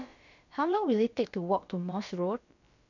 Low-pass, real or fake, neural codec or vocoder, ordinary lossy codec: 7.2 kHz; fake; codec, 16 kHz, about 1 kbps, DyCAST, with the encoder's durations; none